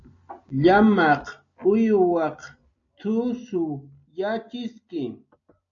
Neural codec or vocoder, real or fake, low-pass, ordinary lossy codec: none; real; 7.2 kHz; AAC, 64 kbps